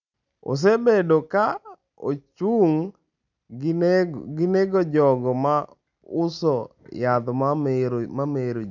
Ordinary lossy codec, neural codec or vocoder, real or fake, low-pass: none; none; real; 7.2 kHz